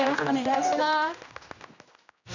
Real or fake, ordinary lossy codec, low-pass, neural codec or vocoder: fake; none; 7.2 kHz; codec, 16 kHz, 1 kbps, X-Codec, HuBERT features, trained on general audio